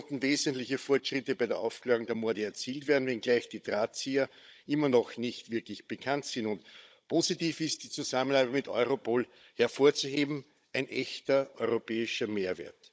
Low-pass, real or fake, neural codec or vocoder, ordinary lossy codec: none; fake; codec, 16 kHz, 16 kbps, FunCodec, trained on Chinese and English, 50 frames a second; none